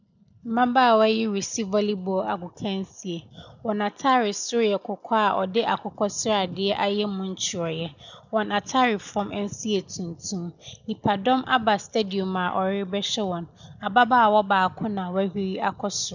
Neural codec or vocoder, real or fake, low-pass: none; real; 7.2 kHz